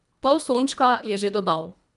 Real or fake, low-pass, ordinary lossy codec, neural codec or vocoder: fake; 10.8 kHz; none; codec, 24 kHz, 1.5 kbps, HILCodec